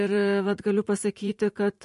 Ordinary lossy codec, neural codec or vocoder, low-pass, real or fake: MP3, 48 kbps; vocoder, 44.1 kHz, 128 mel bands every 512 samples, BigVGAN v2; 14.4 kHz; fake